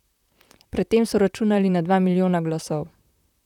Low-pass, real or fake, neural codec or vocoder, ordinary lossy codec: 19.8 kHz; fake; vocoder, 44.1 kHz, 128 mel bands, Pupu-Vocoder; none